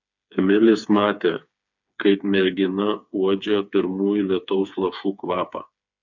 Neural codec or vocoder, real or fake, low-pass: codec, 16 kHz, 4 kbps, FreqCodec, smaller model; fake; 7.2 kHz